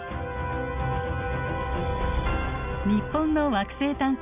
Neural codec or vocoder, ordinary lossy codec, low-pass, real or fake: none; none; 3.6 kHz; real